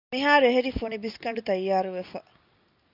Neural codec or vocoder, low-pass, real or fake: none; 5.4 kHz; real